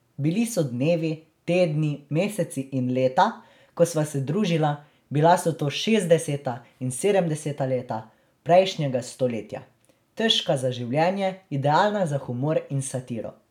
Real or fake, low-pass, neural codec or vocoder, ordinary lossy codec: real; 19.8 kHz; none; none